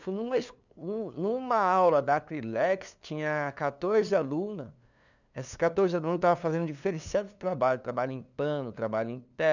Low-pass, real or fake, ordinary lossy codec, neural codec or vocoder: 7.2 kHz; fake; none; codec, 16 kHz, 2 kbps, FunCodec, trained on LibriTTS, 25 frames a second